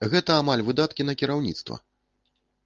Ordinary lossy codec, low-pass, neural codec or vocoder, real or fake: Opus, 32 kbps; 7.2 kHz; none; real